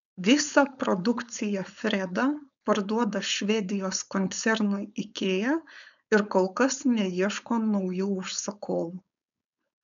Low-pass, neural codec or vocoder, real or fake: 7.2 kHz; codec, 16 kHz, 4.8 kbps, FACodec; fake